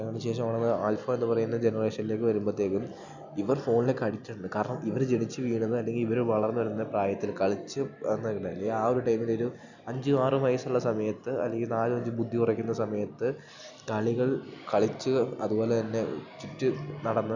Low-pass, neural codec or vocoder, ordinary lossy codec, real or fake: 7.2 kHz; none; none; real